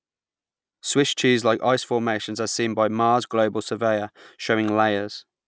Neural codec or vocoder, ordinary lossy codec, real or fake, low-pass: none; none; real; none